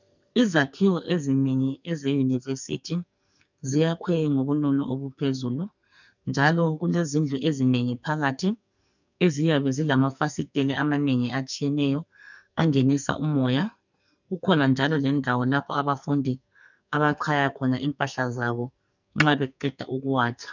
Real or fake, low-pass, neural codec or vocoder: fake; 7.2 kHz; codec, 44.1 kHz, 2.6 kbps, SNAC